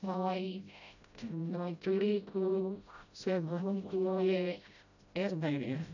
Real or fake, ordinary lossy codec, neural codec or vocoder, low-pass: fake; none; codec, 16 kHz, 0.5 kbps, FreqCodec, smaller model; 7.2 kHz